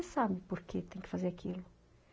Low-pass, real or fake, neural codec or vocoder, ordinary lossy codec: none; real; none; none